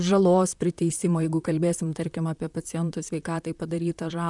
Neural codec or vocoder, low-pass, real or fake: vocoder, 44.1 kHz, 128 mel bands, Pupu-Vocoder; 10.8 kHz; fake